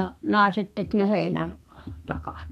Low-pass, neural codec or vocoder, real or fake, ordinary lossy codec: 14.4 kHz; codec, 32 kHz, 1.9 kbps, SNAC; fake; none